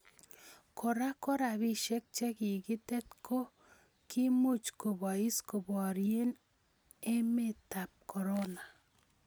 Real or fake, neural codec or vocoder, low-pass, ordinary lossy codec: real; none; none; none